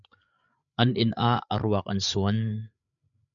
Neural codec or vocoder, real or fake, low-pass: codec, 16 kHz, 8 kbps, FreqCodec, larger model; fake; 7.2 kHz